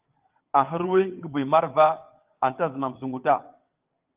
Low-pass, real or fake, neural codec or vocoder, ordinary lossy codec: 3.6 kHz; fake; vocoder, 22.05 kHz, 80 mel bands, WaveNeXt; Opus, 32 kbps